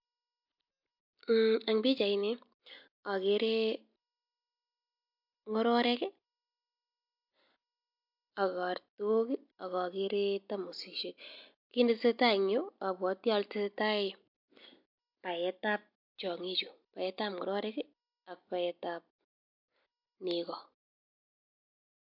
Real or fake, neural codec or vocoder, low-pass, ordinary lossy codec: real; none; 5.4 kHz; MP3, 48 kbps